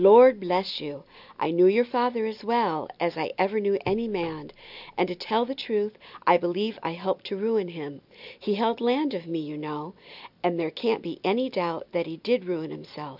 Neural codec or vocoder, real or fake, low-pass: none; real; 5.4 kHz